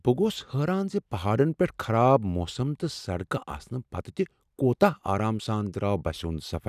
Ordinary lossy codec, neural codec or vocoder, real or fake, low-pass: none; none; real; 14.4 kHz